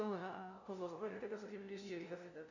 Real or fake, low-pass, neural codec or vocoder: fake; 7.2 kHz; codec, 16 kHz, 0.5 kbps, FunCodec, trained on LibriTTS, 25 frames a second